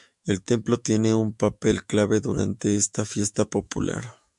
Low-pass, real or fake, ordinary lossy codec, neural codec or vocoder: 10.8 kHz; fake; MP3, 96 kbps; autoencoder, 48 kHz, 128 numbers a frame, DAC-VAE, trained on Japanese speech